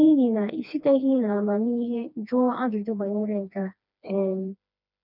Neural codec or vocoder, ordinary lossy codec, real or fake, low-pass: codec, 16 kHz, 2 kbps, FreqCodec, smaller model; none; fake; 5.4 kHz